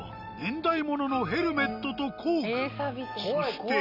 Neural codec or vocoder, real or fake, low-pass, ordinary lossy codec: none; real; 5.4 kHz; none